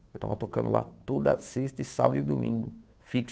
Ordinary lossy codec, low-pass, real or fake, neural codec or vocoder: none; none; fake; codec, 16 kHz, 2 kbps, FunCodec, trained on Chinese and English, 25 frames a second